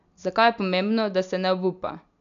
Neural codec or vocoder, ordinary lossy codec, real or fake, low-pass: none; none; real; 7.2 kHz